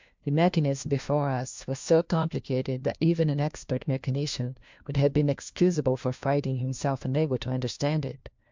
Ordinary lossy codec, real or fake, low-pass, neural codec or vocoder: MP3, 64 kbps; fake; 7.2 kHz; codec, 16 kHz, 1 kbps, FunCodec, trained on LibriTTS, 50 frames a second